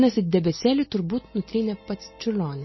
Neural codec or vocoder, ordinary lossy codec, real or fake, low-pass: none; MP3, 24 kbps; real; 7.2 kHz